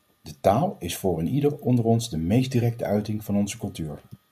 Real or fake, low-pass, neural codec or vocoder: real; 14.4 kHz; none